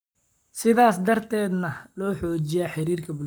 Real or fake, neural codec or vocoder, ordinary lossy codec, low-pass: fake; codec, 44.1 kHz, 7.8 kbps, Pupu-Codec; none; none